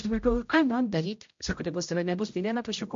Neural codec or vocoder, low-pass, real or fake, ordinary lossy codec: codec, 16 kHz, 0.5 kbps, X-Codec, HuBERT features, trained on general audio; 7.2 kHz; fake; MP3, 48 kbps